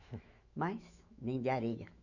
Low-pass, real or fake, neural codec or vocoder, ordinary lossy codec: 7.2 kHz; fake; autoencoder, 48 kHz, 128 numbers a frame, DAC-VAE, trained on Japanese speech; none